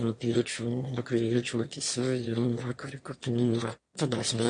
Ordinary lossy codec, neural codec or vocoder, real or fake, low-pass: MP3, 48 kbps; autoencoder, 22.05 kHz, a latent of 192 numbers a frame, VITS, trained on one speaker; fake; 9.9 kHz